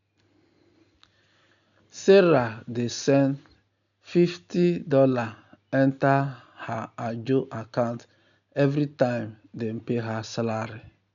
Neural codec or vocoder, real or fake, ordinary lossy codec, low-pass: none; real; none; 7.2 kHz